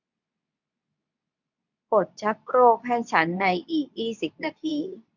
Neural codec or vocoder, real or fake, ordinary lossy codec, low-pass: codec, 24 kHz, 0.9 kbps, WavTokenizer, medium speech release version 2; fake; none; 7.2 kHz